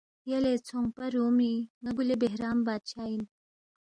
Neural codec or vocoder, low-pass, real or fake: none; 9.9 kHz; real